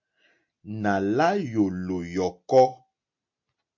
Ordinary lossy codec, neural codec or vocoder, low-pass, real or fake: MP3, 48 kbps; none; 7.2 kHz; real